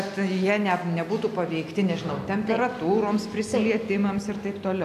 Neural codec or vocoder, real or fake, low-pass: none; real; 14.4 kHz